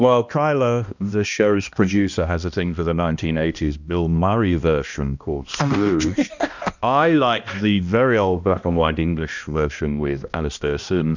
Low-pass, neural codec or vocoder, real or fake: 7.2 kHz; codec, 16 kHz, 1 kbps, X-Codec, HuBERT features, trained on balanced general audio; fake